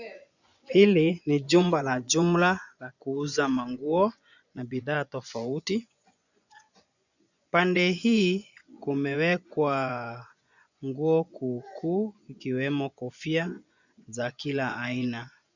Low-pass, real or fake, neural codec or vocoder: 7.2 kHz; fake; vocoder, 24 kHz, 100 mel bands, Vocos